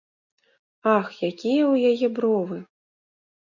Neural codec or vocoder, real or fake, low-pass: none; real; 7.2 kHz